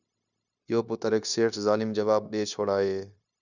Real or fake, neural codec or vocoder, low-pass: fake; codec, 16 kHz, 0.9 kbps, LongCat-Audio-Codec; 7.2 kHz